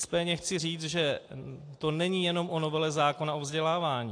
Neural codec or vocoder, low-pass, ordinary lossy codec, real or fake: none; 9.9 kHz; AAC, 48 kbps; real